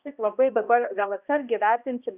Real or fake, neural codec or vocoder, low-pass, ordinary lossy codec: fake; codec, 16 kHz, 1 kbps, X-Codec, HuBERT features, trained on balanced general audio; 3.6 kHz; Opus, 64 kbps